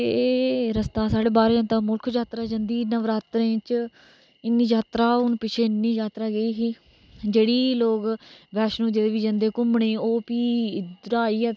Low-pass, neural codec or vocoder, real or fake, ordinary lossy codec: none; none; real; none